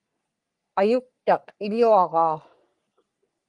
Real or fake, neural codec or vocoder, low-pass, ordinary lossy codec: fake; codec, 44.1 kHz, 3.4 kbps, Pupu-Codec; 10.8 kHz; Opus, 32 kbps